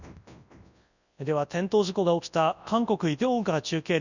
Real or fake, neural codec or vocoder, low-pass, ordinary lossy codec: fake; codec, 24 kHz, 0.9 kbps, WavTokenizer, large speech release; 7.2 kHz; none